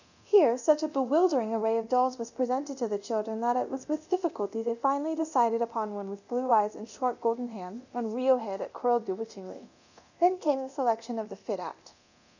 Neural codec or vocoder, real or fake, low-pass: codec, 24 kHz, 0.9 kbps, DualCodec; fake; 7.2 kHz